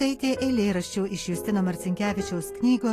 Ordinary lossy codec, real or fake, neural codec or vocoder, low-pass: AAC, 48 kbps; real; none; 14.4 kHz